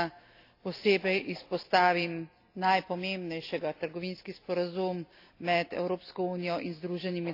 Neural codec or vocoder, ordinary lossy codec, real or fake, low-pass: none; AAC, 32 kbps; real; 5.4 kHz